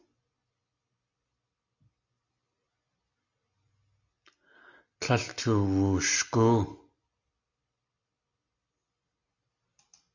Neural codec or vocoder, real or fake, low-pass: none; real; 7.2 kHz